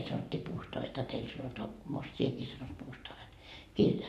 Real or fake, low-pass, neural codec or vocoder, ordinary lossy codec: fake; 14.4 kHz; codec, 44.1 kHz, 7.8 kbps, Pupu-Codec; none